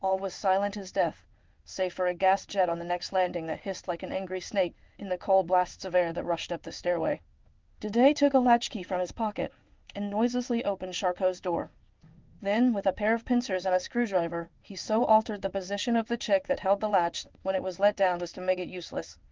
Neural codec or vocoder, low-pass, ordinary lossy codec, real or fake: codec, 16 kHz in and 24 kHz out, 1 kbps, XY-Tokenizer; 7.2 kHz; Opus, 32 kbps; fake